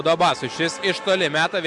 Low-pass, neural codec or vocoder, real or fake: 10.8 kHz; none; real